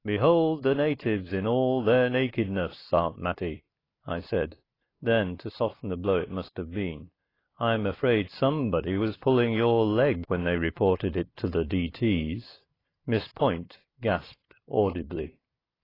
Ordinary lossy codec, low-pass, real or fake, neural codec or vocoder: AAC, 24 kbps; 5.4 kHz; real; none